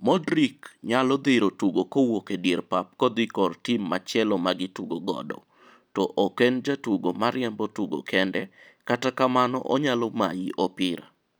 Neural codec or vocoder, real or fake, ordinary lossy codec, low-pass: none; real; none; none